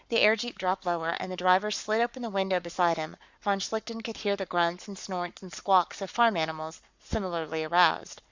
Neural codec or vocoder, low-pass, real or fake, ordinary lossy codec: codec, 44.1 kHz, 7.8 kbps, Pupu-Codec; 7.2 kHz; fake; Opus, 64 kbps